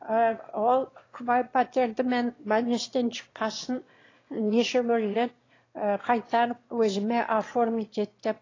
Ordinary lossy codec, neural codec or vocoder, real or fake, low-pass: AAC, 32 kbps; autoencoder, 22.05 kHz, a latent of 192 numbers a frame, VITS, trained on one speaker; fake; 7.2 kHz